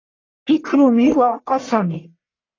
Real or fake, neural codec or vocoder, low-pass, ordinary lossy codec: fake; codec, 44.1 kHz, 1.7 kbps, Pupu-Codec; 7.2 kHz; AAC, 32 kbps